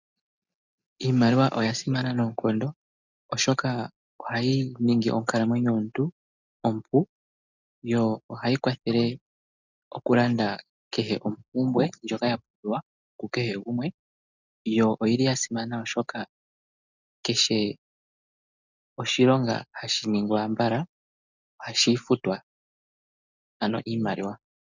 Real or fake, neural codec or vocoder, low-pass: real; none; 7.2 kHz